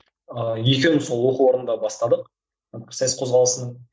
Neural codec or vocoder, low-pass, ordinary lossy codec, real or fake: none; none; none; real